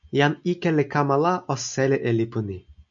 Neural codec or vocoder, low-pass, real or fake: none; 7.2 kHz; real